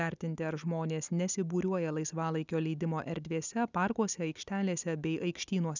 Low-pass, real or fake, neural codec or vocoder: 7.2 kHz; real; none